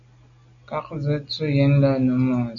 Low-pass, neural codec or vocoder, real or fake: 7.2 kHz; none; real